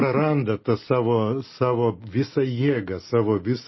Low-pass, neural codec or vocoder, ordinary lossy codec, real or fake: 7.2 kHz; none; MP3, 24 kbps; real